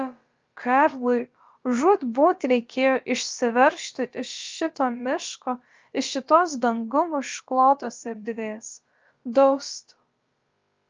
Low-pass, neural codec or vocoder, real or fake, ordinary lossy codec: 7.2 kHz; codec, 16 kHz, about 1 kbps, DyCAST, with the encoder's durations; fake; Opus, 24 kbps